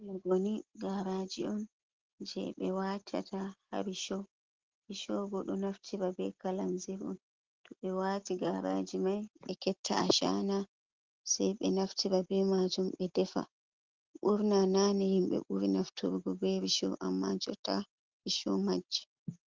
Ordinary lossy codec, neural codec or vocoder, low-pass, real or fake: Opus, 16 kbps; none; 7.2 kHz; real